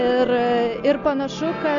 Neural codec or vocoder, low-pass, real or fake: none; 7.2 kHz; real